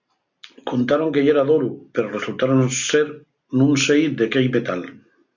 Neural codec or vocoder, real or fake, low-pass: none; real; 7.2 kHz